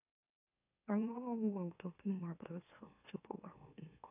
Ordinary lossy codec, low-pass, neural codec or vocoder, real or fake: none; 3.6 kHz; autoencoder, 44.1 kHz, a latent of 192 numbers a frame, MeloTTS; fake